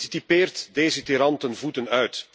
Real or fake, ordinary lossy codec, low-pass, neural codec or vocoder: real; none; none; none